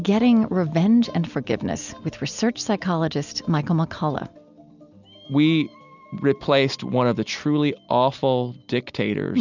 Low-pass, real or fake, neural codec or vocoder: 7.2 kHz; real; none